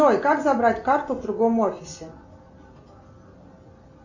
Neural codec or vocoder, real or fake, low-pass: none; real; 7.2 kHz